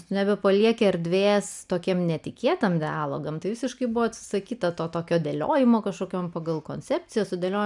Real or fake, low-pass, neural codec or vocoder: real; 10.8 kHz; none